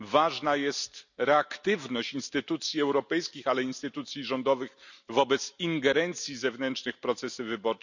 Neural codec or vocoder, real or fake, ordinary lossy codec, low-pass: none; real; none; 7.2 kHz